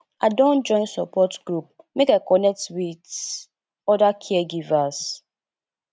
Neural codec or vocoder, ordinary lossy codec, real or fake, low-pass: none; none; real; none